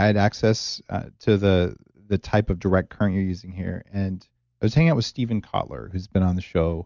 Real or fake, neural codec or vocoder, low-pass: real; none; 7.2 kHz